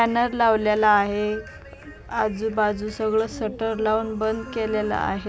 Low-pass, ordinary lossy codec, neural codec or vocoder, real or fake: none; none; none; real